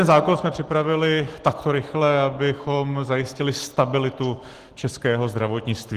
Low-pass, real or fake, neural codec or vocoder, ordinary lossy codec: 14.4 kHz; real; none; Opus, 16 kbps